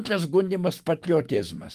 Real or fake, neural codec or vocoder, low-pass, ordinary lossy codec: fake; autoencoder, 48 kHz, 128 numbers a frame, DAC-VAE, trained on Japanese speech; 14.4 kHz; Opus, 24 kbps